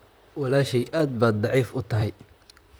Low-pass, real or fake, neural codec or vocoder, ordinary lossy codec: none; fake; vocoder, 44.1 kHz, 128 mel bands, Pupu-Vocoder; none